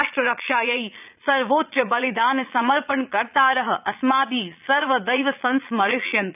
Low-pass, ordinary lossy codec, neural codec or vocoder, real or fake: 3.6 kHz; none; vocoder, 22.05 kHz, 80 mel bands, Vocos; fake